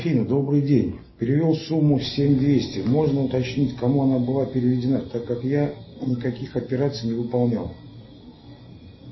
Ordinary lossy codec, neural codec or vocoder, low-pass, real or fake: MP3, 24 kbps; none; 7.2 kHz; real